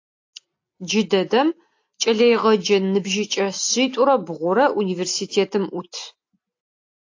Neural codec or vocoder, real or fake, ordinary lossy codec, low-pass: none; real; AAC, 48 kbps; 7.2 kHz